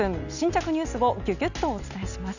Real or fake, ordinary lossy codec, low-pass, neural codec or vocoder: real; none; 7.2 kHz; none